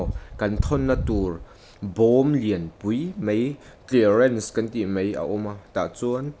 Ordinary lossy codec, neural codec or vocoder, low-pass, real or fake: none; none; none; real